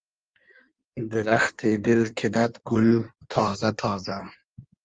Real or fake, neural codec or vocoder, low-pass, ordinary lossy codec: fake; codec, 16 kHz in and 24 kHz out, 1.1 kbps, FireRedTTS-2 codec; 9.9 kHz; Opus, 32 kbps